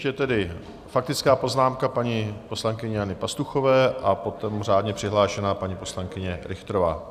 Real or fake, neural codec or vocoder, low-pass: real; none; 14.4 kHz